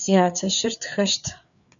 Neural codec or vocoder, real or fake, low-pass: codec, 16 kHz, 4 kbps, FreqCodec, larger model; fake; 7.2 kHz